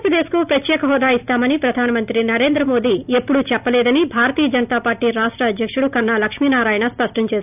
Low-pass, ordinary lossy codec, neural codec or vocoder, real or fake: 3.6 kHz; none; none; real